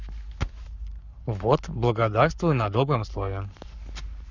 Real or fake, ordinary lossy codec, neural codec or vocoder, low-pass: fake; none; codec, 44.1 kHz, 7.8 kbps, Pupu-Codec; 7.2 kHz